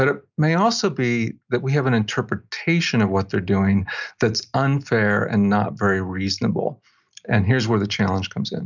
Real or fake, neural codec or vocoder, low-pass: real; none; 7.2 kHz